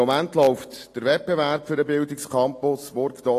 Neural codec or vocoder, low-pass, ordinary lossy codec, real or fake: none; 14.4 kHz; AAC, 48 kbps; real